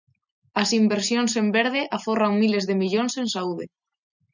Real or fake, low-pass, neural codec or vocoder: real; 7.2 kHz; none